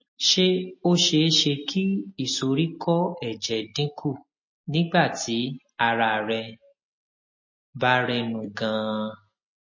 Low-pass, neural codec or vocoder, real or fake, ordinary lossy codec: 7.2 kHz; none; real; MP3, 32 kbps